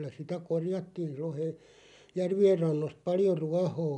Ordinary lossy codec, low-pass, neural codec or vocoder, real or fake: none; 10.8 kHz; none; real